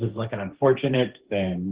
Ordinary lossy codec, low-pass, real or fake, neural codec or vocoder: Opus, 16 kbps; 3.6 kHz; fake; codec, 16 kHz, 1.1 kbps, Voila-Tokenizer